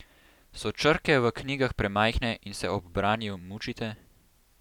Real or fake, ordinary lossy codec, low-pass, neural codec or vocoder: real; none; 19.8 kHz; none